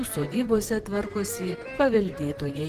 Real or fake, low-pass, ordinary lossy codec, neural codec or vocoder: fake; 14.4 kHz; Opus, 16 kbps; vocoder, 44.1 kHz, 128 mel bands, Pupu-Vocoder